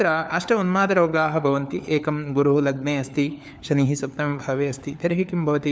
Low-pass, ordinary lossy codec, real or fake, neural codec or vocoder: none; none; fake; codec, 16 kHz, 4 kbps, FunCodec, trained on LibriTTS, 50 frames a second